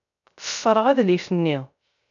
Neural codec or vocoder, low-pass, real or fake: codec, 16 kHz, 0.3 kbps, FocalCodec; 7.2 kHz; fake